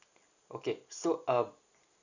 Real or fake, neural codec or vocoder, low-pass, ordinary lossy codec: real; none; 7.2 kHz; none